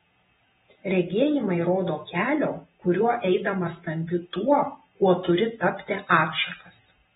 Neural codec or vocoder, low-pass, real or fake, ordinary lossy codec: vocoder, 48 kHz, 128 mel bands, Vocos; 19.8 kHz; fake; AAC, 16 kbps